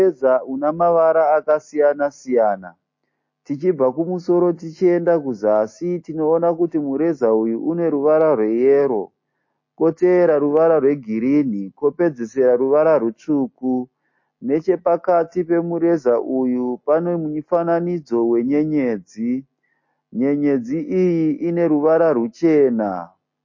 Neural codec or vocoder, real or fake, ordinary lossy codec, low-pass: none; real; MP3, 32 kbps; 7.2 kHz